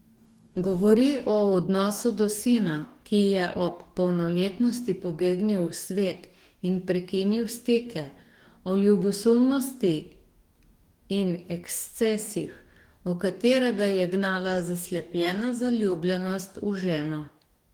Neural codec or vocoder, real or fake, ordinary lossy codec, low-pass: codec, 44.1 kHz, 2.6 kbps, DAC; fake; Opus, 24 kbps; 19.8 kHz